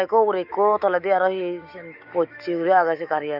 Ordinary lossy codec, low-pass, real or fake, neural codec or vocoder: none; 5.4 kHz; fake; autoencoder, 48 kHz, 128 numbers a frame, DAC-VAE, trained on Japanese speech